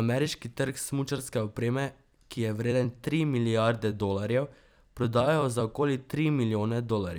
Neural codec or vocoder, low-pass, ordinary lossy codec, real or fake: vocoder, 44.1 kHz, 128 mel bands every 256 samples, BigVGAN v2; none; none; fake